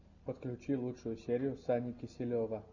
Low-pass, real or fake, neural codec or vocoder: 7.2 kHz; real; none